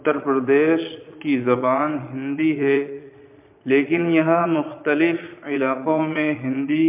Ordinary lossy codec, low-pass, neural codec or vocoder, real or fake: MP3, 32 kbps; 3.6 kHz; vocoder, 22.05 kHz, 80 mel bands, Vocos; fake